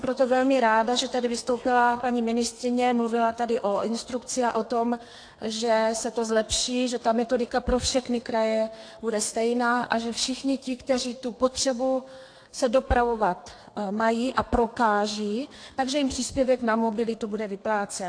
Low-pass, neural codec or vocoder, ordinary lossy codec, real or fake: 9.9 kHz; codec, 32 kHz, 1.9 kbps, SNAC; AAC, 48 kbps; fake